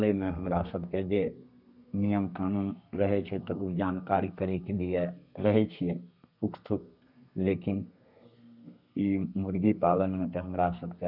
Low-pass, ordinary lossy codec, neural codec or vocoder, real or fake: 5.4 kHz; none; codec, 32 kHz, 1.9 kbps, SNAC; fake